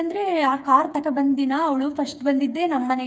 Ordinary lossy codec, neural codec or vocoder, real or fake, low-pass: none; codec, 16 kHz, 4 kbps, FreqCodec, smaller model; fake; none